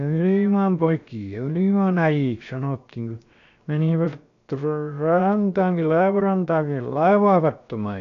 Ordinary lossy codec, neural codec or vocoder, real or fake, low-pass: AAC, 64 kbps; codec, 16 kHz, 0.7 kbps, FocalCodec; fake; 7.2 kHz